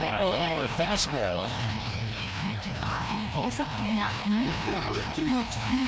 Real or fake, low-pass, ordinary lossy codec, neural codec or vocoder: fake; none; none; codec, 16 kHz, 1 kbps, FreqCodec, larger model